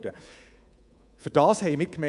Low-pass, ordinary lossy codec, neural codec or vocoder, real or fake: 10.8 kHz; none; codec, 24 kHz, 3.1 kbps, DualCodec; fake